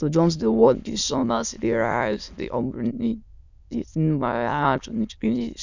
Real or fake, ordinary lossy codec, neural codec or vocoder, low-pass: fake; none; autoencoder, 22.05 kHz, a latent of 192 numbers a frame, VITS, trained on many speakers; 7.2 kHz